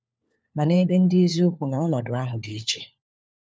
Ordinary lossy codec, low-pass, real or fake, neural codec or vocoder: none; none; fake; codec, 16 kHz, 4 kbps, FunCodec, trained on LibriTTS, 50 frames a second